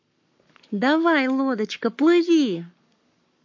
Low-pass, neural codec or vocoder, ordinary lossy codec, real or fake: 7.2 kHz; codec, 44.1 kHz, 7.8 kbps, Pupu-Codec; MP3, 48 kbps; fake